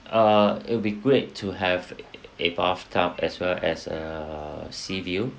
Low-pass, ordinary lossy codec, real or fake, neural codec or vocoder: none; none; real; none